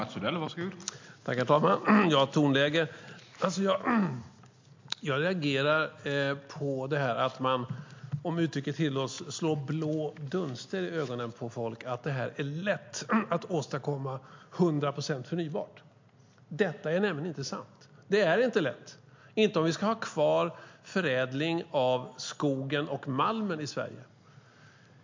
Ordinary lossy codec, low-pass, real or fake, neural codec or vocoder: MP3, 48 kbps; 7.2 kHz; real; none